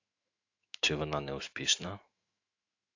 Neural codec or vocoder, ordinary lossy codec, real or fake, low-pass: autoencoder, 48 kHz, 128 numbers a frame, DAC-VAE, trained on Japanese speech; AAC, 48 kbps; fake; 7.2 kHz